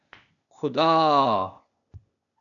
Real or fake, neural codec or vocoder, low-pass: fake; codec, 16 kHz, 0.8 kbps, ZipCodec; 7.2 kHz